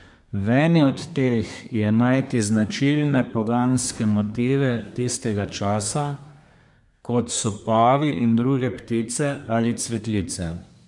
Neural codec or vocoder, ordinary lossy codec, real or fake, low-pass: codec, 24 kHz, 1 kbps, SNAC; none; fake; 10.8 kHz